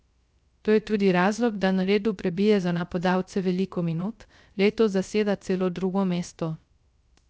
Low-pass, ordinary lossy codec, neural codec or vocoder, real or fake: none; none; codec, 16 kHz, 0.3 kbps, FocalCodec; fake